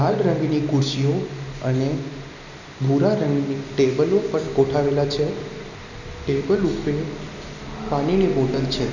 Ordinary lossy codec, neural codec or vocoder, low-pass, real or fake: none; none; 7.2 kHz; real